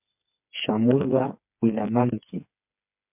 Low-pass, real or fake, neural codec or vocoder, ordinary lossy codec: 3.6 kHz; fake; codec, 16 kHz, 4 kbps, FreqCodec, smaller model; MP3, 32 kbps